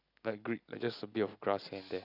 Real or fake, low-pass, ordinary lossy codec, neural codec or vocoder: real; 5.4 kHz; none; none